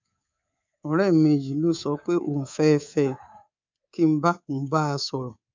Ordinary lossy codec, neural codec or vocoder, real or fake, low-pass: MP3, 64 kbps; codec, 24 kHz, 3.1 kbps, DualCodec; fake; 7.2 kHz